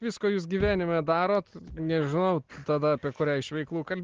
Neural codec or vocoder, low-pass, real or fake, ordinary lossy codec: none; 7.2 kHz; real; Opus, 16 kbps